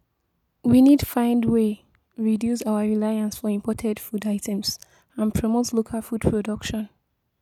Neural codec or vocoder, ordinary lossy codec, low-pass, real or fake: none; none; 19.8 kHz; real